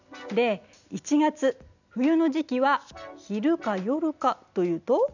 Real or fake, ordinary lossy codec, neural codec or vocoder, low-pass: real; none; none; 7.2 kHz